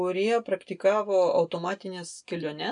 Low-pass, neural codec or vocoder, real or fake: 9.9 kHz; none; real